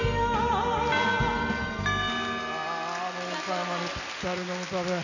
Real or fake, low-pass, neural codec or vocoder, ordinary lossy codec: real; 7.2 kHz; none; none